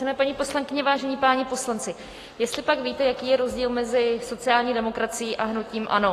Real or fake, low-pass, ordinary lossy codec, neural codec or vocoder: real; 14.4 kHz; AAC, 48 kbps; none